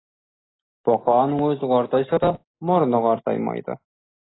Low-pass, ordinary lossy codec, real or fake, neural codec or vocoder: 7.2 kHz; AAC, 16 kbps; real; none